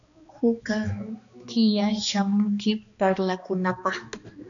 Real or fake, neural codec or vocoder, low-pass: fake; codec, 16 kHz, 2 kbps, X-Codec, HuBERT features, trained on balanced general audio; 7.2 kHz